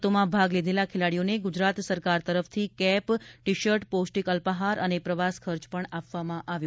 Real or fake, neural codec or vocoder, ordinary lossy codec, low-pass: real; none; none; none